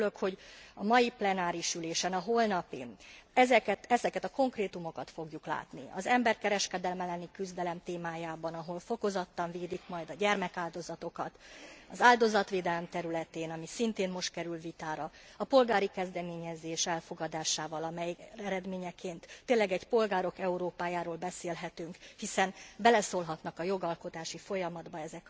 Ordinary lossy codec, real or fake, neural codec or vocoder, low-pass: none; real; none; none